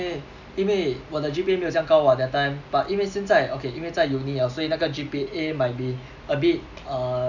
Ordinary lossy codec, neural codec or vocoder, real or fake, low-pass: none; none; real; 7.2 kHz